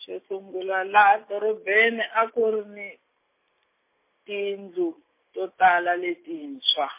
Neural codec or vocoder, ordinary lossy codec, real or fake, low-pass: none; MP3, 24 kbps; real; 3.6 kHz